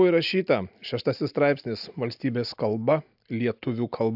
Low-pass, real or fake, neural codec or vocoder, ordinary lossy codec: 5.4 kHz; real; none; AAC, 48 kbps